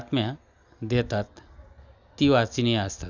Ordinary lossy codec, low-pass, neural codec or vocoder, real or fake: none; 7.2 kHz; none; real